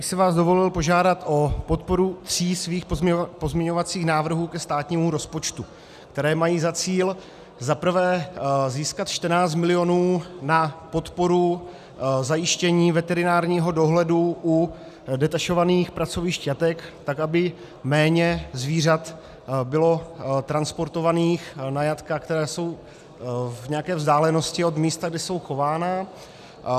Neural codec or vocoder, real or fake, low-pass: none; real; 14.4 kHz